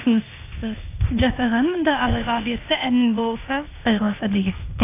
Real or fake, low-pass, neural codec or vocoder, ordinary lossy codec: fake; 3.6 kHz; codec, 16 kHz, 0.8 kbps, ZipCodec; AAC, 24 kbps